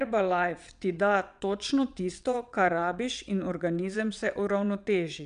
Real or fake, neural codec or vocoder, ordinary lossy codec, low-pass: fake; vocoder, 22.05 kHz, 80 mel bands, WaveNeXt; none; 9.9 kHz